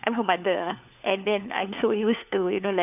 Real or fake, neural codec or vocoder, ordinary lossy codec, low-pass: fake; codec, 16 kHz, 4 kbps, FunCodec, trained on LibriTTS, 50 frames a second; none; 3.6 kHz